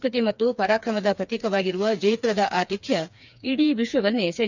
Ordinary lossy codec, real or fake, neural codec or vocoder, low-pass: none; fake; codec, 16 kHz, 4 kbps, FreqCodec, smaller model; 7.2 kHz